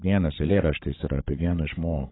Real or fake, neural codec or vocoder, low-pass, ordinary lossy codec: fake; codec, 16 kHz, 8 kbps, FreqCodec, larger model; 7.2 kHz; AAC, 16 kbps